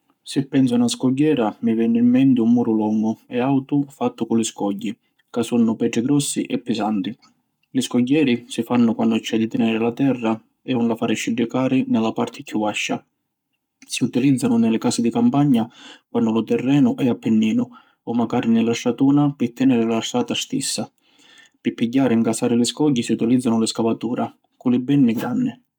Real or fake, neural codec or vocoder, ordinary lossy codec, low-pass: fake; codec, 44.1 kHz, 7.8 kbps, Pupu-Codec; none; 19.8 kHz